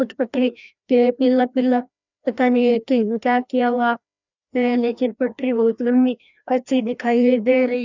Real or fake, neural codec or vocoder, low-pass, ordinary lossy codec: fake; codec, 16 kHz, 1 kbps, FreqCodec, larger model; 7.2 kHz; none